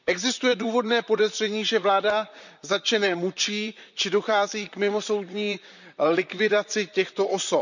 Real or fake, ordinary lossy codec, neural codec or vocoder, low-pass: fake; none; vocoder, 44.1 kHz, 128 mel bands, Pupu-Vocoder; 7.2 kHz